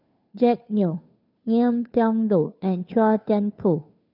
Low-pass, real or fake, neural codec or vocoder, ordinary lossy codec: 5.4 kHz; fake; codec, 16 kHz, 2 kbps, FunCodec, trained on Chinese and English, 25 frames a second; none